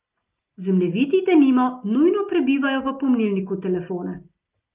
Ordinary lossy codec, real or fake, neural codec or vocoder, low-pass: Opus, 24 kbps; real; none; 3.6 kHz